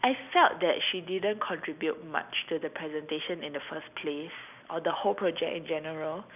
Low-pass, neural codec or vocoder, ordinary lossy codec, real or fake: 3.6 kHz; none; none; real